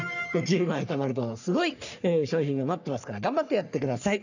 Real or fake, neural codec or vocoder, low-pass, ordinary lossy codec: fake; codec, 44.1 kHz, 3.4 kbps, Pupu-Codec; 7.2 kHz; none